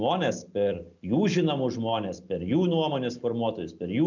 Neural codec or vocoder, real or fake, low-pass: none; real; 7.2 kHz